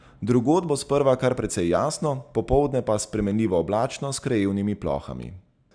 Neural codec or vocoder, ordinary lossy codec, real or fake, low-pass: none; none; real; 9.9 kHz